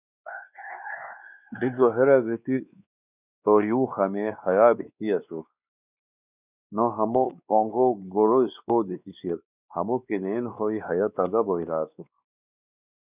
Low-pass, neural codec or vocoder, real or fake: 3.6 kHz; codec, 16 kHz, 2 kbps, X-Codec, WavLM features, trained on Multilingual LibriSpeech; fake